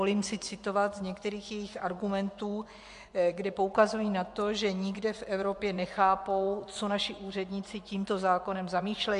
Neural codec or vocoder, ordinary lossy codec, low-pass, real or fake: vocoder, 24 kHz, 100 mel bands, Vocos; MP3, 64 kbps; 10.8 kHz; fake